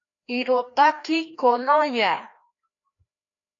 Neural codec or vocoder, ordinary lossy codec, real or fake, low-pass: codec, 16 kHz, 1 kbps, FreqCodec, larger model; AAC, 48 kbps; fake; 7.2 kHz